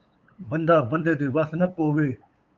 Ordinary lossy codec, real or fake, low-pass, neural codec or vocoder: Opus, 24 kbps; fake; 7.2 kHz; codec, 16 kHz, 8 kbps, FunCodec, trained on LibriTTS, 25 frames a second